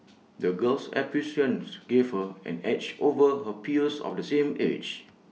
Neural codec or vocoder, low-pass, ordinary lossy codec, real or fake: none; none; none; real